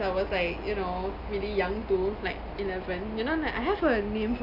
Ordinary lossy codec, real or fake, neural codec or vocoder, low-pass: none; real; none; 5.4 kHz